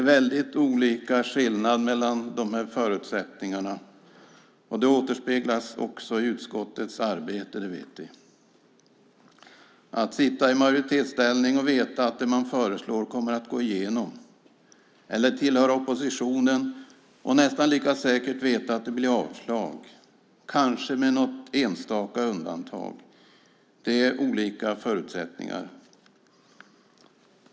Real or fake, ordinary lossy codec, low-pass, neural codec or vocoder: real; none; none; none